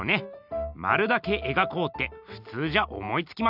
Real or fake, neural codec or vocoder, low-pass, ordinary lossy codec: real; none; 5.4 kHz; none